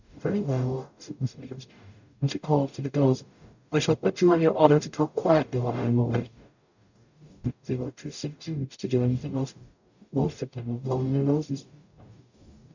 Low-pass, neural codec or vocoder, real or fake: 7.2 kHz; codec, 44.1 kHz, 0.9 kbps, DAC; fake